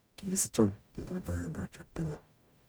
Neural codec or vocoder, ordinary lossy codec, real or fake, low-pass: codec, 44.1 kHz, 0.9 kbps, DAC; none; fake; none